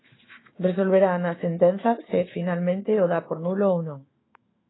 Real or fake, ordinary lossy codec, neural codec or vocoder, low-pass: fake; AAC, 16 kbps; autoencoder, 48 kHz, 128 numbers a frame, DAC-VAE, trained on Japanese speech; 7.2 kHz